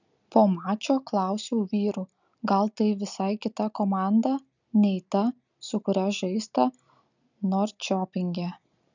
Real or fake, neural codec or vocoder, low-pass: real; none; 7.2 kHz